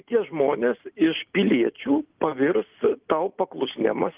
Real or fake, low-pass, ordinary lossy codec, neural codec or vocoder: real; 3.6 kHz; Opus, 32 kbps; none